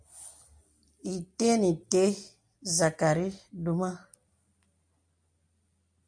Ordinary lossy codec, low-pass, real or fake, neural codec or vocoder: MP3, 96 kbps; 9.9 kHz; real; none